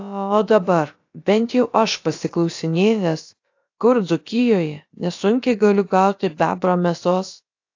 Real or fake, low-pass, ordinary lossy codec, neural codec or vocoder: fake; 7.2 kHz; AAC, 48 kbps; codec, 16 kHz, about 1 kbps, DyCAST, with the encoder's durations